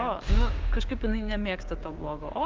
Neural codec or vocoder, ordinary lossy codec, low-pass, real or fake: codec, 16 kHz, 6 kbps, DAC; Opus, 32 kbps; 7.2 kHz; fake